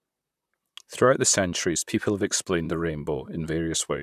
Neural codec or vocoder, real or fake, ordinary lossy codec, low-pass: vocoder, 44.1 kHz, 128 mel bands, Pupu-Vocoder; fake; none; 14.4 kHz